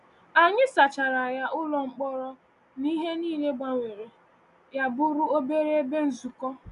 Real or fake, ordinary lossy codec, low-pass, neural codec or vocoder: real; none; 10.8 kHz; none